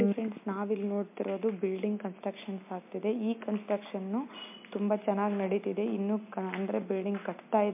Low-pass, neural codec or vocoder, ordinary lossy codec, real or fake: 3.6 kHz; none; MP3, 32 kbps; real